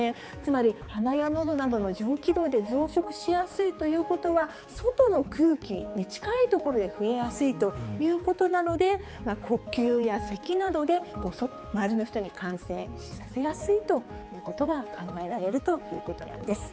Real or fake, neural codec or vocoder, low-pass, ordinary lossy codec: fake; codec, 16 kHz, 4 kbps, X-Codec, HuBERT features, trained on balanced general audio; none; none